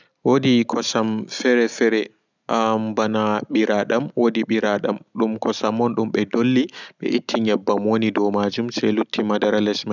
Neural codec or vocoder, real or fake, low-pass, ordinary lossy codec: none; real; 7.2 kHz; none